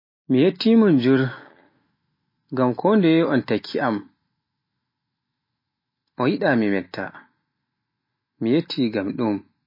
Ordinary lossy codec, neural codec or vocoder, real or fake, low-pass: MP3, 24 kbps; none; real; 5.4 kHz